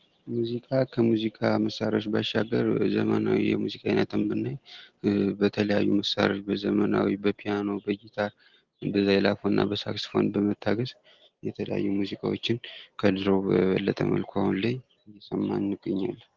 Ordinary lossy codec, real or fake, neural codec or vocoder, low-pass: Opus, 16 kbps; real; none; 7.2 kHz